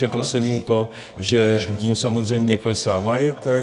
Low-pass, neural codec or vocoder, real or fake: 10.8 kHz; codec, 24 kHz, 0.9 kbps, WavTokenizer, medium music audio release; fake